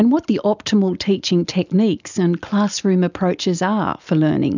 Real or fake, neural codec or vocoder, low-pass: real; none; 7.2 kHz